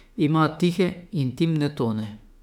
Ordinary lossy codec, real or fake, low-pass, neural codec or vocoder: none; fake; 19.8 kHz; autoencoder, 48 kHz, 32 numbers a frame, DAC-VAE, trained on Japanese speech